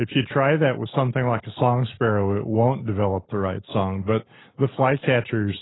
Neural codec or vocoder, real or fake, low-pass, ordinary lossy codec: none; real; 7.2 kHz; AAC, 16 kbps